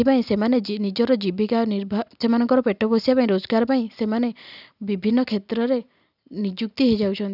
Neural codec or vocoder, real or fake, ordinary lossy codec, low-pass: none; real; none; 5.4 kHz